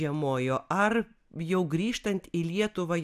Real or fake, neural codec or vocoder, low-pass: real; none; 14.4 kHz